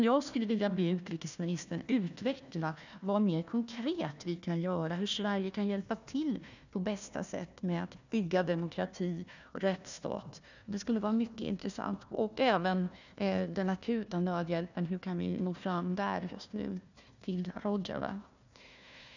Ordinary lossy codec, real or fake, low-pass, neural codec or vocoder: none; fake; 7.2 kHz; codec, 16 kHz, 1 kbps, FunCodec, trained on Chinese and English, 50 frames a second